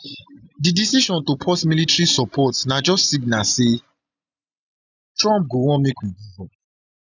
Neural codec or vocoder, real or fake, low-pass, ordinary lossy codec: none; real; 7.2 kHz; none